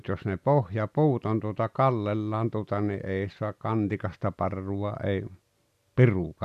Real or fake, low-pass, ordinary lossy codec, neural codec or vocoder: real; 14.4 kHz; none; none